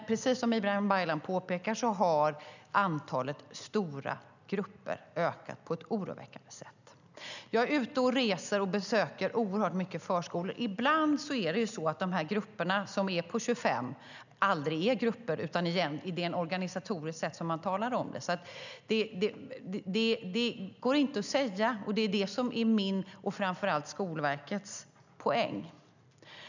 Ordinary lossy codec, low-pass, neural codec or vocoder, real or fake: none; 7.2 kHz; none; real